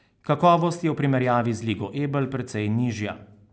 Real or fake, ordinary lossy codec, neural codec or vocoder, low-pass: real; none; none; none